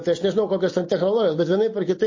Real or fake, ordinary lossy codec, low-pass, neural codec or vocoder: real; MP3, 32 kbps; 7.2 kHz; none